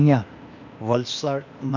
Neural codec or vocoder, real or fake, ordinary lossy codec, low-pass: codec, 16 kHz, 0.8 kbps, ZipCodec; fake; none; 7.2 kHz